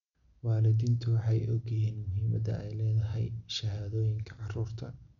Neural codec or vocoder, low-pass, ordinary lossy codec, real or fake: none; 7.2 kHz; none; real